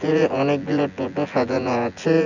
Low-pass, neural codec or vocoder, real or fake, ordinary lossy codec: 7.2 kHz; vocoder, 24 kHz, 100 mel bands, Vocos; fake; none